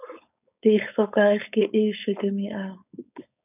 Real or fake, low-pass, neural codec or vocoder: fake; 3.6 kHz; codec, 16 kHz, 4.8 kbps, FACodec